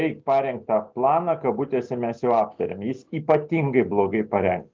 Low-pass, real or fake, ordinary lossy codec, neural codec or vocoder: 7.2 kHz; real; Opus, 32 kbps; none